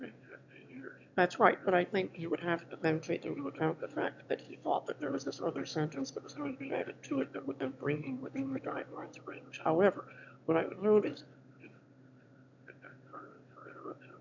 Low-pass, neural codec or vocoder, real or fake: 7.2 kHz; autoencoder, 22.05 kHz, a latent of 192 numbers a frame, VITS, trained on one speaker; fake